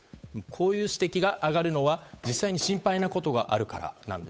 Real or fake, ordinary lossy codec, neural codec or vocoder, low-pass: fake; none; codec, 16 kHz, 8 kbps, FunCodec, trained on Chinese and English, 25 frames a second; none